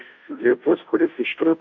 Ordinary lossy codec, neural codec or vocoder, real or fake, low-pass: MP3, 48 kbps; codec, 16 kHz, 0.5 kbps, FunCodec, trained on Chinese and English, 25 frames a second; fake; 7.2 kHz